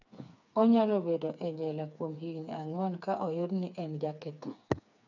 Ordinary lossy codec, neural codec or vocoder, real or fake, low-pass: none; codec, 16 kHz, 4 kbps, FreqCodec, smaller model; fake; 7.2 kHz